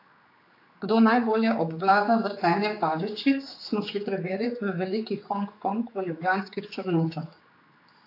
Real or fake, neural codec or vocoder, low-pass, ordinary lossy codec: fake; codec, 16 kHz, 4 kbps, X-Codec, HuBERT features, trained on general audio; 5.4 kHz; AAC, 32 kbps